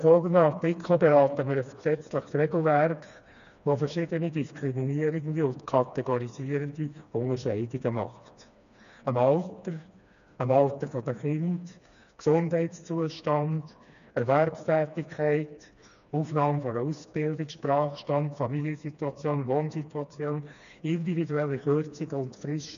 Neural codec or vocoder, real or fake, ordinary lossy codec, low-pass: codec, 16 kHz, 2 kbps, FreqCodec, smaller model; fake; none; 7.2 kHz